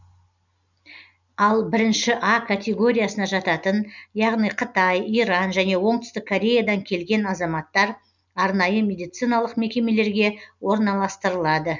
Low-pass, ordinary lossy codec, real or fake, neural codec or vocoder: 7.2 kHz; none; real; none